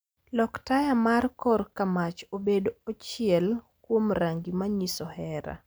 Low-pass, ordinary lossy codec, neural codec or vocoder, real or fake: none; none; none; real